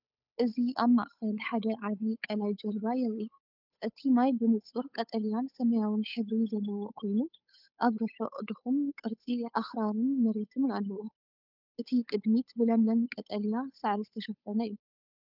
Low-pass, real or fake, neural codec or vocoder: 5.4 kHz; fake; codec, 16 kHz, 8 kbps, FunCodec, trained on Chinese and English, 25 frames a second